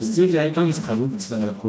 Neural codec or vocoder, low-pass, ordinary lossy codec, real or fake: codec, 16 kHz, 1 kbps, FreqCodec, smaller model; none; none; fake